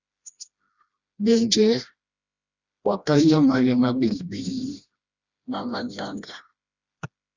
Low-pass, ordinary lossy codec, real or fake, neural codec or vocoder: 7.2 kHz; Opus, 64 kbps; fake; codec, 16 kHz, 1 kbps, FreqCodec, smaller model